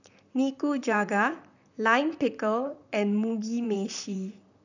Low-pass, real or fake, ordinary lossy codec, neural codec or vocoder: 7.2 kHz; fake; none; vocoder, 44.1 kHz, 128 mel bands, Pupu-Vocoder